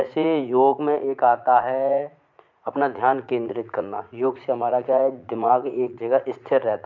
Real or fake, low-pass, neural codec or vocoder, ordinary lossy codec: fake; 7.2 kHz; vocoder, 44.1 kHz, 80 mel bands, Vocos; MP3, 64 kbps